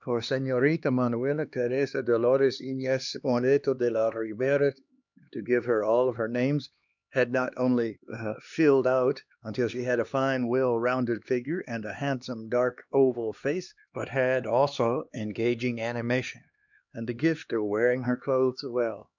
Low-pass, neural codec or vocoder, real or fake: 7.2 kHz; codec, 16 kHz, 2 kbps, X-Codec, HuBERT features, trained on LibriSpeech; fake